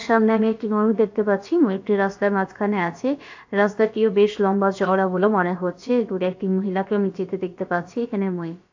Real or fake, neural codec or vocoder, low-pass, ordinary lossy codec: fake; codec, 16 kHz, about 1 kbps, DyCAST, with the encoder's durations; 7.2 kHz; AAC, 48 kbps